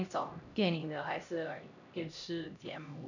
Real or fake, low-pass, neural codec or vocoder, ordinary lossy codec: fake; 7.2 kHz; codec, 16 kHz, 1 kbps, X-Codec, HuBERT features, trained on LibriSpeech; none